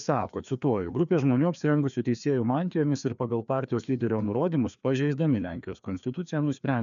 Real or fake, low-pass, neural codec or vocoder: fake; 7.2 kHz; codec, 16 kHz, 2 kbps, FreqCodec, larger model